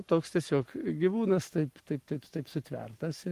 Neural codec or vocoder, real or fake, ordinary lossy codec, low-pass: codec, 44.1 kHz, 7.8 kbps, Pupu-Codec; fake; Opus, 24 kbps; 14.4 kHz